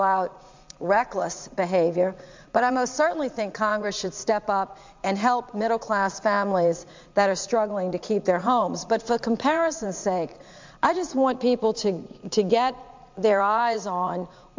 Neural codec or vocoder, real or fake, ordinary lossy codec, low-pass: vocoder, 44.1 kHz, 80 mel bands, Vocos; fake; MP3, 64 kbps; 7.2 kHz